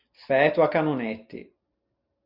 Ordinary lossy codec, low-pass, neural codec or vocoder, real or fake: Opus, 64 kbps; 5.4 kHz; none; real